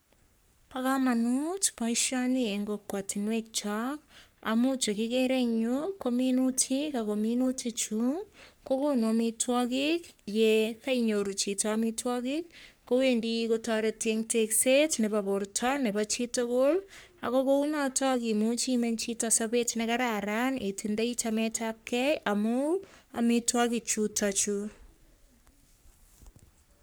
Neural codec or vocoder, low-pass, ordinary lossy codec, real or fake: codec, 44.1 kHz, 3.4 kbps, Pupu-Codec; none; none; fake